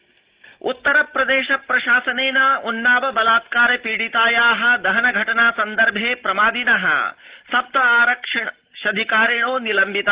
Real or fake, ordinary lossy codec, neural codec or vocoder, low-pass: real; Opus, 16 kbps; none; 3.6 kHz